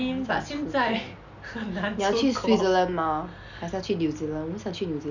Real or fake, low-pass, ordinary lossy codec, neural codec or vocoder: real; 7.2 kHz; none; none